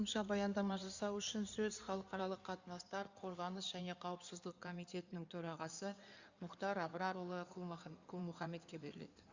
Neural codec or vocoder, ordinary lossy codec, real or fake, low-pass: codec, 16 kHz in and 24 kHz out, 2.2 kbps, FireRedTTS-2 codec; Opus, 64 kbps; fake; 7.2 kHz